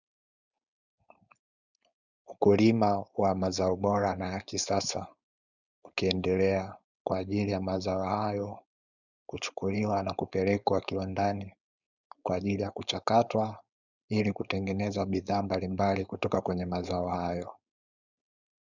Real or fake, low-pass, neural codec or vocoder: fake; 7.2 kHz; codec, 16 kHz, 4.8 kbps, FACodec